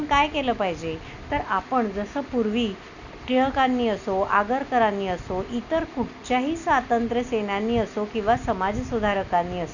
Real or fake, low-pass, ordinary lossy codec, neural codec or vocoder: real; 7.2 kHz; none; none